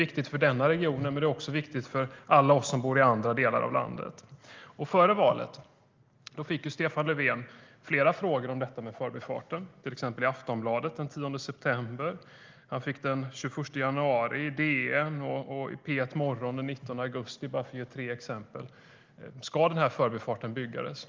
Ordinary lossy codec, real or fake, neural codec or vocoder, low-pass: Opus, 32 kbps; real; none; 7.2 kHz